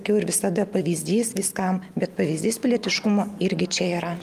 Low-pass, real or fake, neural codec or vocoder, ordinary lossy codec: 14.4 kHz; real; none; Opus, 32 kbps